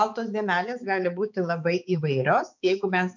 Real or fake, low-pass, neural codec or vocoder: fake; 7.2 kHz; codec, 16 kHz, 4 kbps, X-Codec, HuBERT features, trained on balanced general audio